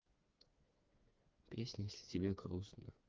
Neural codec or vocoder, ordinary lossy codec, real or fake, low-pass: codec, 16 kHz, 4 kbps, FreqCodec, smaller model; Opus, 32 kbps; fake; 7.2 kHz